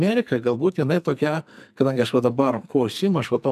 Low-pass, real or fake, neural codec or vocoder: 14.4 kHz; fake; codec, 44.1 kHz, 2.6 kbps, SNAC